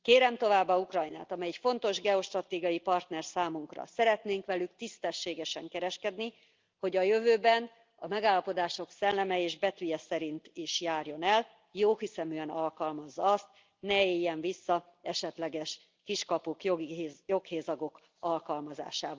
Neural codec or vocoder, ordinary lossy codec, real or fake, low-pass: none; Opus, 24 kbps; real; 7.2 kHz